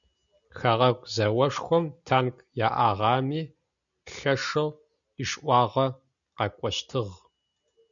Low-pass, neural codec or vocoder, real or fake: 7.2 kHz; none; real